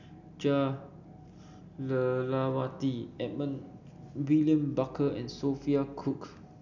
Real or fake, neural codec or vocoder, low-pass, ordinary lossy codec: real; none; 7.2 kHz; none